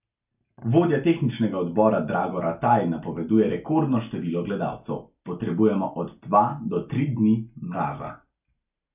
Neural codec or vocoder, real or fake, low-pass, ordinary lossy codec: none; real; 3.6 kHz; none